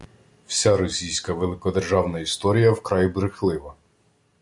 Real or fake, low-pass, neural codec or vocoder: real; 10.8 kHz; none